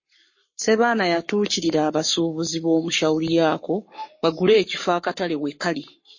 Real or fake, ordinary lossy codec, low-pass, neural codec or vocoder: fake; MP3, 32 kbps; 7.2 kHz; codec, 44.1 kHz, 7.8 kbps, Pupu-Codec